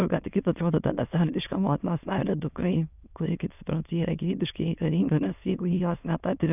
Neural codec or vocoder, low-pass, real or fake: autoencoder, 22.05 kHz, a latent of 192 numbers a frame, VITS, trained on many speakers; 3.6 kHz; fake